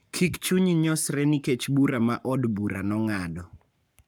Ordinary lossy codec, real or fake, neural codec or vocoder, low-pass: none; fake; codec, 44.1 kHz, 7.8 kbps, Pupu-Codec; none